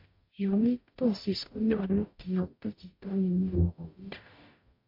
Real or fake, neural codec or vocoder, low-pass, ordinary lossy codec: fake; codec, 44.1 kHz, 0.9 kbps, DAC; 5.4 kHz; MP3, 32 kbps